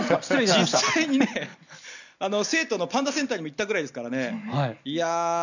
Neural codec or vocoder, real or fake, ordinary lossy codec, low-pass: none; real; none; 7.2 kHz